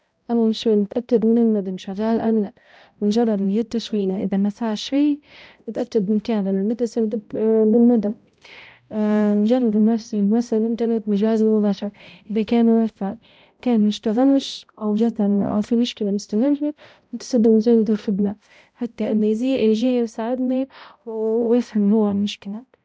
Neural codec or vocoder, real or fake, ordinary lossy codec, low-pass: codec, 16 kHz, 0.5 kbps, X-Codec, HuBERT features, trained on balanced general audio; fake; none; none